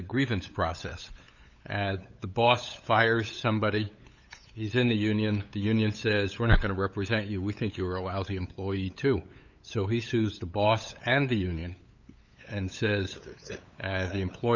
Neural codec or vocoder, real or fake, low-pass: codec, 16 kHz, 8 kbps, FunCodec, trained on LibriTTS, 25 frames a second; fake; 7.2 kHz